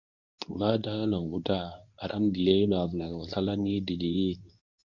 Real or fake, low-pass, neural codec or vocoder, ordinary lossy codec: fake; 7.2 kHz; codec, 24 kHz, 0.9 kbps, WavTokenizer, medium speech release version 2; Opus, 64 kbps